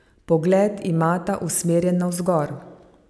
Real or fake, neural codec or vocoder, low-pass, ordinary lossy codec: real; none; none; none